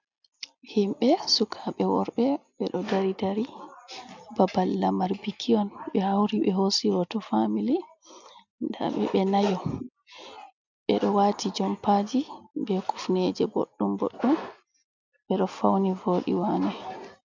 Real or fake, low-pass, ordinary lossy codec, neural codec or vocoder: real; 7.2 kHz; MP3, 64 kbps; none